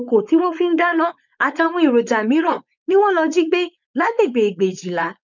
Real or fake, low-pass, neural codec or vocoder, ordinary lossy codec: fake; 7.2 kHz; codec, 16 kHz, 4.8 kbps, FACodec; none